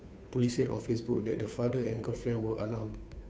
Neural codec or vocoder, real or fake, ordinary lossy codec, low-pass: codec, 16 kHz, 2 kbps, FunCodec, trained on Chinese and English, 25 frames a second; fake; none; none